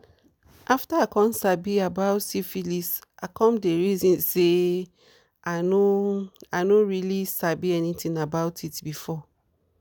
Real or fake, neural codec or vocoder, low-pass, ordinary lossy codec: real; none; none; none